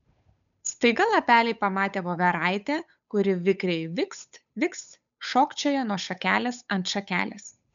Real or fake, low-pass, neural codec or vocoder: fake; 7.2 kHz; codec, 16 kHz, 8 kbps, FunCodec, trained on Chinese and English, 25 frames a second